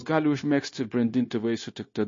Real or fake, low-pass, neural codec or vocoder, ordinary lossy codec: fake; 7.2 kHz; codec, 16 kHz, 0.9 kbps, LongCat-Audio-Codec; MP3, 32 kbps